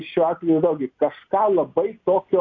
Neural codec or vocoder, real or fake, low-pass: none; real; 7.2 kHz